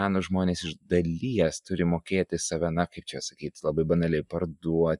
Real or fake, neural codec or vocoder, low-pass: real; none; 10.8 kHz